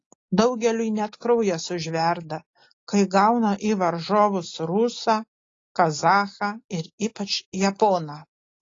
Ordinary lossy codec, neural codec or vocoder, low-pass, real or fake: AAC, 32 kbps; none; 7.2 kHz; real